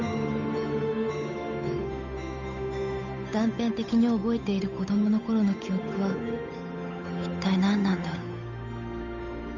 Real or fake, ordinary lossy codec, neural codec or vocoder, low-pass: fake; none; codec, 16 kHz, 8 kbps, FunCodec, trained on Chinese and English, 25 frames a second; 7.2 kHz